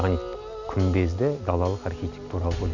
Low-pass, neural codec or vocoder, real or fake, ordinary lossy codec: 7.2 kHz; none; real; none